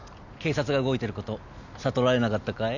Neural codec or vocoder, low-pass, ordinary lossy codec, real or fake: none; 7.2 kHz; none; real